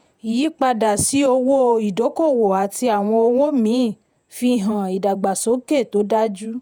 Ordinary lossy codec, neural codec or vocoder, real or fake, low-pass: none; vocoder, 48 kHz, 128 mel bands, Vocos; fake; none